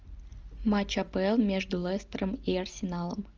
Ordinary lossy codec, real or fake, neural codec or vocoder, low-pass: Opus, 24 kbps; real; none; 7.2 kHz